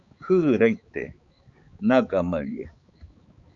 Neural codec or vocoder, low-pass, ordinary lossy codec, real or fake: codec, 16 kHz, 4 kbps, X-Codec, HuBERT features, trained on balanced general audio; 7.2 kHz; Opus, 64 kbps; fake